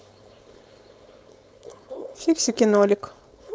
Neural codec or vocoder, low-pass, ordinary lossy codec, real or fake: codec, 16 kHz, 4.8 kbps, FACodec; none; none; fake